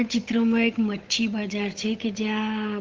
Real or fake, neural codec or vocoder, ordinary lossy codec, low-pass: fake; codec, 16 kHz, 8 kbps, FunCodec, trained on Chinese and English, 25 frames a second; Opus, 32 kbps; 7.2 kHz